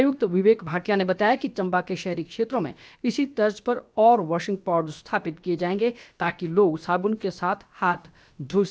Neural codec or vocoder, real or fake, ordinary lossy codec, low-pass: codec, 16 kHz, about 1 kbps, DyCAST, with the encoder's durations; fake; none; none